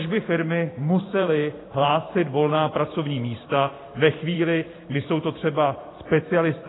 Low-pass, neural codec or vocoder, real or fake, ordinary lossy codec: 7.2 kHz; vocoder, 44.1 kHz, 128 mel bands every 512 samples, BigVGAN v2; fake; AAC, 16 kbps